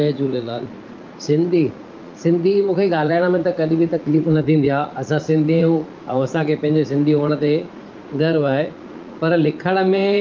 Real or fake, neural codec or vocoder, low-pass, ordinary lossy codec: fake; vocoder, 44.1 kHz, 80 mel bands, Vocos; 7.2 kHz; Opus, 24 kbps